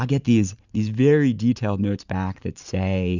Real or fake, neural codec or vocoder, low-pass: fake; vocoder, 44.1 kHz, 128 mel bands every 512 samples, BigVGAN v2; 7.2 kHz